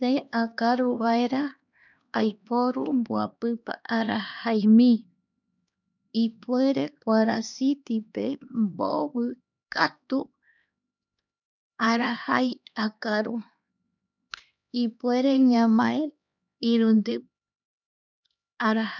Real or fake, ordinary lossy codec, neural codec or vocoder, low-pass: fake; none; codec, 16 kHz, 2 kbps, X-Codec, HuBERT features, trained on LibriSpeech; 7.2 kHz